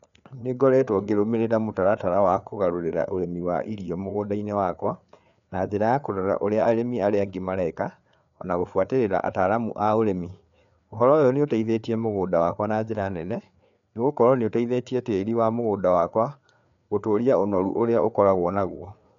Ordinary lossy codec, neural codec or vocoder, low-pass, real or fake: none; codec, 16 kHz, 4 kbps, FreqCodec, larger model; 7.2 kHz; fake